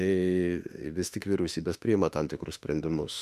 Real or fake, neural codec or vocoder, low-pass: fake; autoencoder, 48 kHz, 32 numbers a frame, DAC-VAE, trained on Japanese speech; 14.4 kHz